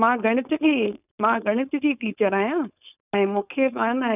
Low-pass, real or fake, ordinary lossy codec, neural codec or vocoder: 3.6 kHz; fake; none; codec, 16 kHz, 4.8 kbps, FACodec